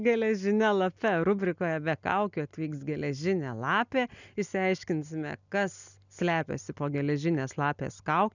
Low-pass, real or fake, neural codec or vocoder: 7.2 kHz; real; none